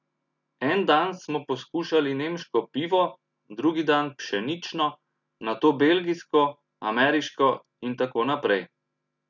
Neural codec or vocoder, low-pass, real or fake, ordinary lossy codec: none; 7.2 kHz; real; none